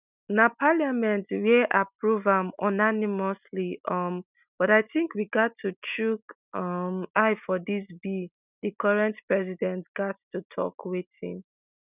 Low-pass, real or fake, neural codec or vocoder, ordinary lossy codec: 3.6 kHz; real; none; none